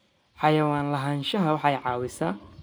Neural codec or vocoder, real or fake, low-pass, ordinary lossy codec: none; real; none; none